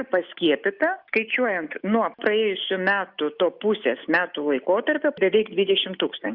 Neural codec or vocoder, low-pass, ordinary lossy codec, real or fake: none; 5.4 kHz; Opus, 64 kbps; real